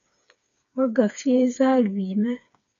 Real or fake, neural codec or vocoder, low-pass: fake; codec, 16 kHz, 4 kbps, FreqCodec, smaller model; 7.2 kHz